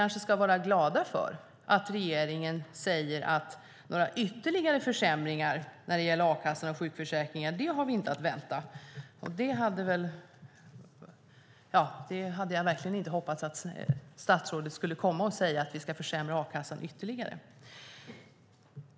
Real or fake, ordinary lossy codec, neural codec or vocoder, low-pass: real; none; none; none